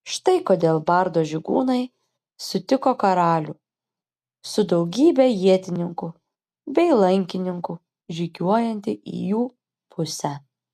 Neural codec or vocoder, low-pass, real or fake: none; 14.4 kHz; real